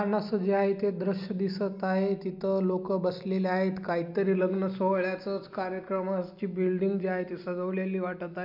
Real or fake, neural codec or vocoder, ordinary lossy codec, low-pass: real; none; MP3, 48 kbps; 5.4 kHz